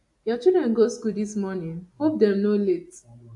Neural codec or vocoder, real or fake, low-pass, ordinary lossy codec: none; real; 10.8 kHz; AAC, 64 kbps